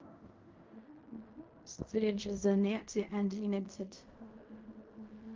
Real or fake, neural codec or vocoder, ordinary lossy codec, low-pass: fake; codec, 16 kHz in and 24 kHz out, 0.4 kbps, LongCat-Audio-Codec, fine tuned four codebook decoder; Opus, 32 kbps; 7.2 kHz